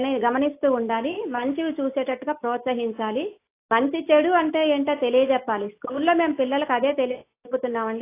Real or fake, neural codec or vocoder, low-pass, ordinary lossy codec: real; none; 3.6 kHz; AAC, 24 kbps